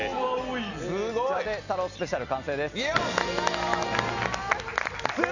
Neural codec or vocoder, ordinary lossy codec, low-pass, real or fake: none; none; 7.2 kHz; real